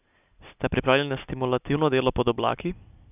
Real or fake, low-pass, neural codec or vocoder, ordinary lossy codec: real; 3.6 kHz; none; none